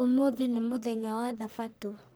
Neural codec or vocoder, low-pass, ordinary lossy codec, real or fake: codec, 44.1 kHz, 1.7 kbps, Pupu-Codec; none; none; fake